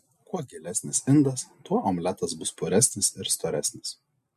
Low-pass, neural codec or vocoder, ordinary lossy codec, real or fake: 14.4 kHz; none; MP3, 64 kbps; real